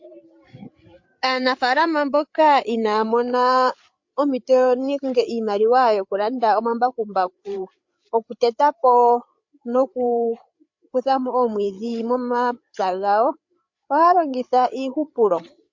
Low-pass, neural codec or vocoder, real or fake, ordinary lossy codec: 7.2 kHz; codec, 16 kHz, 8 kbps, FreqCodec, larger model; fake; MP3, 48 kbps